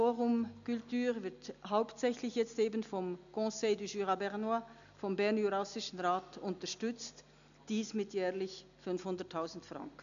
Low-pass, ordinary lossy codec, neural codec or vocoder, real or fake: 7.2 kHz; none; none; real